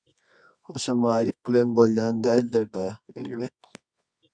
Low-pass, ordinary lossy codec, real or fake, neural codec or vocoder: 9.9 kHz; MP3, 96 kbps; fake; codec, 24 kHz, 0.9 kbps, WavTokenizer, medium music audio release